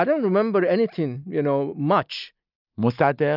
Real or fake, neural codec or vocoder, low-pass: real; none; 5.4 kHz